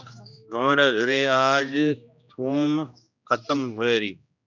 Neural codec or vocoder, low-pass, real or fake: codec, 16 kHz, 1 kbps, X-Codec, HuBERT features, trained on general audio; 7.2 kHz; fake